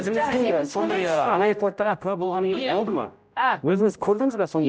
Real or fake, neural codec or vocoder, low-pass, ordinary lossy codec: fake; codec, 16 kHz, 0.5 kbps, X-Codec, HuBERT features, trained on general audio; none; none